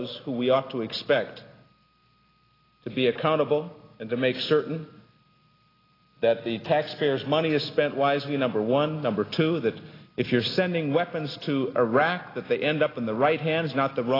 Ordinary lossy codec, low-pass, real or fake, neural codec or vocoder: AAC, 24 kbps; 5.4 kHz; real; none